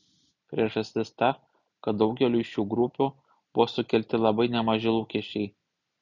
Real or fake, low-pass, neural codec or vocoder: real; 7.2 kHz; none